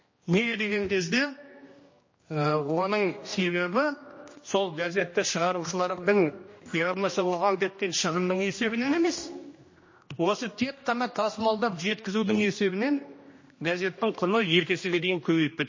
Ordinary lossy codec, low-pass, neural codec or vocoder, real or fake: MP3, 32 kbps; 7.2 kHz; codec, 16 kHz, 1 kbps, X-Codec, HuBERT features, trained on general audio; fake